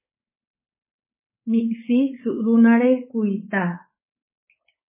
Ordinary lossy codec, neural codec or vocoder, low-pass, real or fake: MP3, 16 kbps; codec, 16 kHz, 4.8 kbps, FACodec; 3.6 kHz; fake